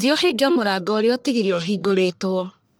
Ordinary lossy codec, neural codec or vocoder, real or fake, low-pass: none; codec, 44.1 kHz, 1.7 kbps, Pupu-Codec; fake; none